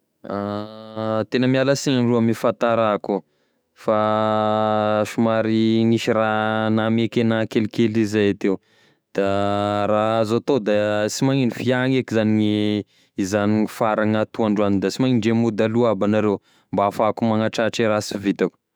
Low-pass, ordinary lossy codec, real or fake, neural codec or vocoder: none; none; fake; autoencoder, 48 kHz, 128 numbers a frame, DAC-VAE, trained on Japanese speech